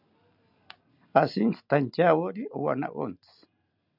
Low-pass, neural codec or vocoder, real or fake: 5.4 kHz; none; real